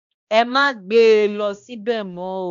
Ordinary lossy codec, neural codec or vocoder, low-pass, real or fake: none; codec, 16 kHz, 1 kbps, X-Codec, HuBERT features, trained on balanced general audio; 7.2 kHz; fake